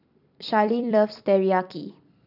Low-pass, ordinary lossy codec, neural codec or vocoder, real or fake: 5.4 kHz; none; vocoder, 44.1 kHz, 128 mel bands every 256 samples, BigVGAN v2; fake